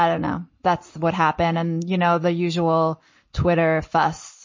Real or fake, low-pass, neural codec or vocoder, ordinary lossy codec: real; 7.2 kHz; none; MP3, 32 kbps